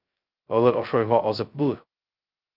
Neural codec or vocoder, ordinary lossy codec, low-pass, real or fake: codec, 16 kHz, 0.2 kbps, FocalCodec; Opus, 24 kbps; 5.4 kHz; fake